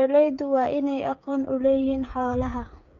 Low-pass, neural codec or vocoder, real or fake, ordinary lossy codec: 7.2 kHz; codec, 16 kHz, 8 kbps, FreqCodec, smaller model; fake; MP3, 64 kbps